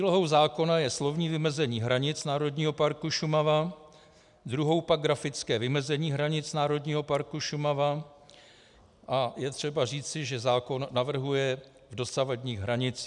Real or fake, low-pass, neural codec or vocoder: real; 10.8 kHz; none